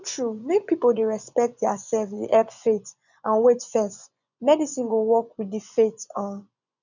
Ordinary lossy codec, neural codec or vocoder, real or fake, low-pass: none; vocoder, 24 kHz, 100 mel bands, Vocos; fake; 7.2 kHz